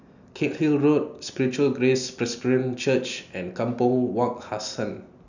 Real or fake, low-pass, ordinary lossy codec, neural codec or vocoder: real; 7.2 kHz; none; none